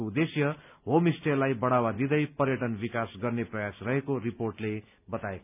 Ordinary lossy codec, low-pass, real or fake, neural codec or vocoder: none; 3.6 kHz; real; none